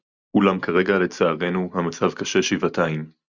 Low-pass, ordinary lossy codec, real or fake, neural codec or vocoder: 7.2 kHz; Opus, 64 kbps; real; none